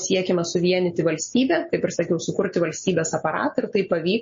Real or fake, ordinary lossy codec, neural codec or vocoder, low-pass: real; MP3, 32 kbps; none; 7.2 kHz